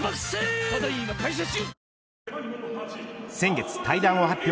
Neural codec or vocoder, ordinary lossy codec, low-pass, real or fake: none; none; none; real